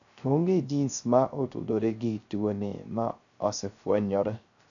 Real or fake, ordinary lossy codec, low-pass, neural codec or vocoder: fake; none; 7.2 kHz; codec, 16 kHz, 0.3 kbps, FocalCodec